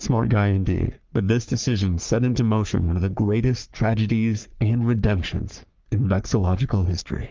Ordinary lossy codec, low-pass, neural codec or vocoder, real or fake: Opus, 24 kbps; 7.2 kHz; codec, 44.1 kHz, 3.4 kbps, Pupu-Codec; fake